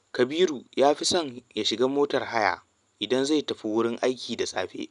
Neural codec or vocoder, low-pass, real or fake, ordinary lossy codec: none; 10.8 kHz; real; none